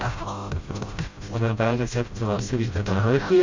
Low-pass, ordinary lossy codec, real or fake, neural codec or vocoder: 7.2 kHz; MP3, 48 kbps; fake; codec, 16 kHz, 0.5 kbps, FreqCodec, smaller model